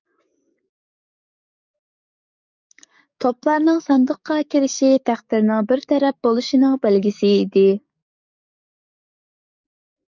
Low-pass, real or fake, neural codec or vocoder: 7.2 kHz; fake; codec, 44.1 kHz, 7.8 kbps, DAC